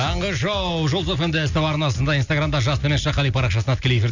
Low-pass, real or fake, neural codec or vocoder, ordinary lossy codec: 7.2 kHz; real; none; none